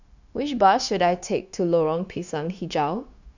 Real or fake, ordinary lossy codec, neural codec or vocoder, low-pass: fake; none; codec, 16 kHz, 0.9 kbps, LongCat-Audio-Codec; 7.2 kHz